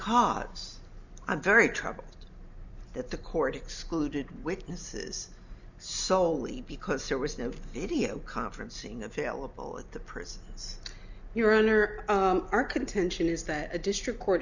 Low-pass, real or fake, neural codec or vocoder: 7.2 kHz; real; none